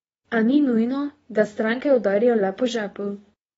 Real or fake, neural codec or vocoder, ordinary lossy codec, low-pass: fake; codec, 24 kHz, 0.9 kbps, WavTokenizer, medium speech release version 2; AAC, 24 kbps; 10.8 kHz